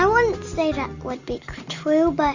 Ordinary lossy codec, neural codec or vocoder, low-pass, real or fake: Opus, 64 kbps; none; 7.2 kHz; real